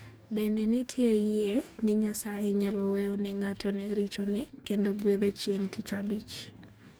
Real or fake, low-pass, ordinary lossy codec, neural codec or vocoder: fake; none; none; codec, 44.1 kHz, 2.6 kbps, DAC